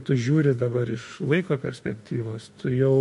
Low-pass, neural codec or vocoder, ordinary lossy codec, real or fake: 14.4 kHz; autoencoder, 48 kHz, 32 numbers a frame, DAC-VAE, trained on Japanese speech; MP3, 48 kbps; fake